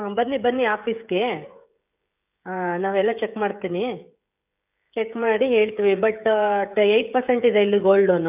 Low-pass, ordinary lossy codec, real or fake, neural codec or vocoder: 3.6 kHz; none; fake; codec, 16 kHz, 16 kbps, FreqCodec, smaller model